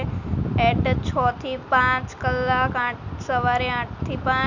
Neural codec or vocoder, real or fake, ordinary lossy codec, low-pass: none; real; none; 7.2 kHz